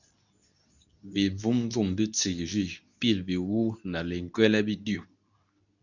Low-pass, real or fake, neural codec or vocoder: 7.2 kHz; fake; codec, 24 kHz, 0.9 kbps, WavTokenizer, medium speech release version 2